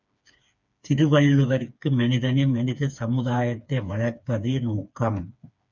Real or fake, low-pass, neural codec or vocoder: fake; 7.2 kHz; codec, 16 kHz, 4 kbps, FreqCodec, smaller model